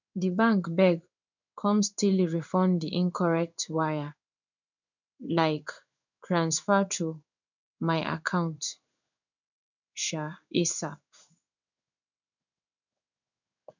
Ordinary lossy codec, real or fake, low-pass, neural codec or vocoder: none; fake; 7.2 kHz; codec, 16 kHz in and 24 kHz out, 1 kbps, XY-Tokenizer